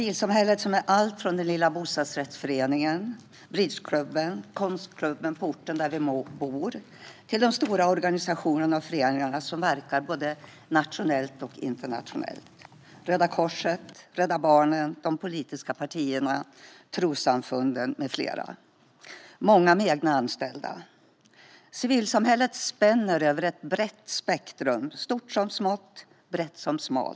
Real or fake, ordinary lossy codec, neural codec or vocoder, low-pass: real; none; none; none